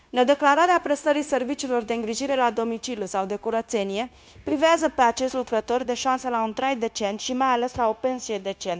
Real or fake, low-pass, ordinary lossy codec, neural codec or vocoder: fake; none; none; codec, 16 kHz, 0.9 kbps, LongCat-Audio-Codec